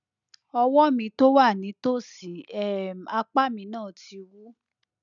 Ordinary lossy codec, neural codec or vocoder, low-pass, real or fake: none; none; 7.2 kHz; real